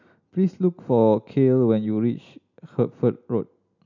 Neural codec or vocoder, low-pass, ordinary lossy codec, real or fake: none; 7.2 kHz; none; real